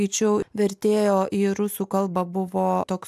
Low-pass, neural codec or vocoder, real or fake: 14.4 kHz; none; real